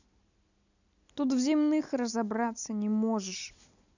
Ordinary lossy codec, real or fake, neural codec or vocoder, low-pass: none; real; none; 7.2 kHz